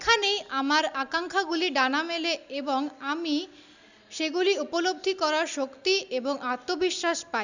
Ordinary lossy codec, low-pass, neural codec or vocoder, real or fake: none; 7.2 kHz; none; real